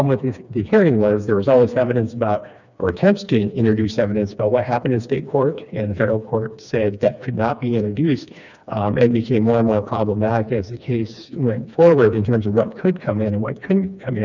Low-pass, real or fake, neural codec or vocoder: 7.2 kHz; fake; codec, 16 kHz, 2 kbps, FreqCodec, smaller model